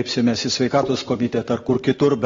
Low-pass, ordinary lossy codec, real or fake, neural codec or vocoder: 7.2 kHz; AAC, 24 kbps; real; none